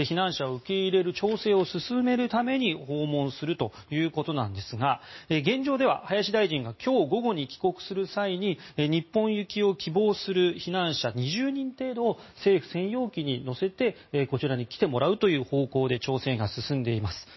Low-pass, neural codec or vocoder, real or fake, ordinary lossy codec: 7.2 kHz; none; real; MP3, 24 kbps